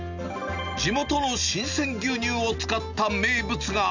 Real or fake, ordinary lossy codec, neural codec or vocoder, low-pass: real; none; none; 7.2 kHz